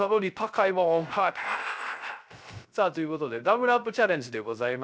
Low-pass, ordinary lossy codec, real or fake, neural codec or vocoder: none; none; fake; codec, 16 kHz, 0.3 kbps, FocalCodec